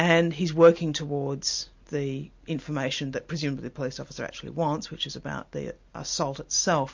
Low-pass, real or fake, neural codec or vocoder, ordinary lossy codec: 7.2 kHz; real; none; MP3, 48 kbps